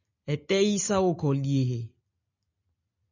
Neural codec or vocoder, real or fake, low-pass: none; real; 7.2 kHz